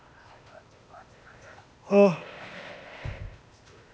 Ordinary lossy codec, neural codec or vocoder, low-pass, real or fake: none; codec, 16 kHz, 0.8 kbps, ZipCodec; none; fake